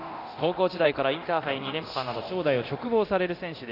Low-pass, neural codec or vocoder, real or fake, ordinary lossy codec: 5.4 kHz; codec, 24 kHz, 0.9 kbps, DualCodec; fake; none